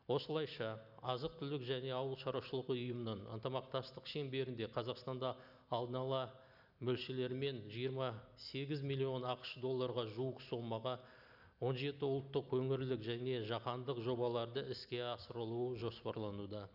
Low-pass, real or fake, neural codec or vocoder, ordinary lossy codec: 5.4 kHz; real; none; none